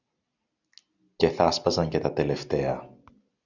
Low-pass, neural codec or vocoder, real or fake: 7.2 kHz; none; real